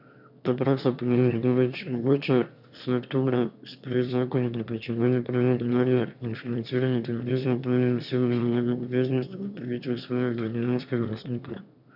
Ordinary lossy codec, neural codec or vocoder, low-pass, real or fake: none; autoencoder, 22.05 kHz, a latent of 192 numbers a frame, VITS, trained on one speaker; 5.4 kHz; fake